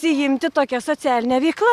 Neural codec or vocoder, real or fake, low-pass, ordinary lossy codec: none; real; 14.4 kHz; Opus, 64 kbps